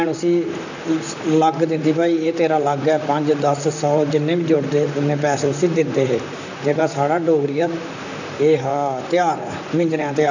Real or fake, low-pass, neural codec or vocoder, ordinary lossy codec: fake; 7.2 kHz; vocoder, 44.1 kHz, 128 mel bands, Pupu-Vocoder; none